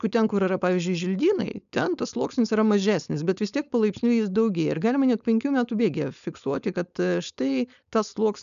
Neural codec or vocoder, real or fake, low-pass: codec, 16 kHz, 4.8 kbps, FACodec; fake; 7.2 kHz